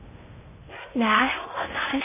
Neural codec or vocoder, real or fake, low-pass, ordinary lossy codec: codec, 16 kHz in and 24 kHz out, 0.6 kbps, FocalCodec, streaming, 2048 codes; fake; 3.6 kHz; MP3, 16 kbps